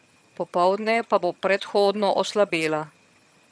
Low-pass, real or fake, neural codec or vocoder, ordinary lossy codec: none; fake; vocoder, 22.05 kHz, 80 mel bands, HiFi-GAN; none